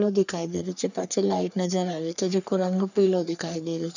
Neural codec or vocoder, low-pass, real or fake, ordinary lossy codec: codec, 44.1 kHz, 3.4 kbps, Pupu-Codec; 7.2 kHz; fake; none